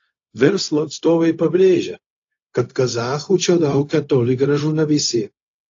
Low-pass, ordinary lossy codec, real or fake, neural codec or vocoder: 7.2 kHz; AAC, 48 kbps; fake; codec, 16 kHz, 0.4 kbps, LongCat-Audio-Codec